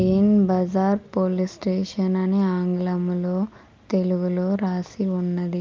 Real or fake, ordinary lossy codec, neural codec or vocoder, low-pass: real; Opus, 32 kbps; none; 7.2 kHz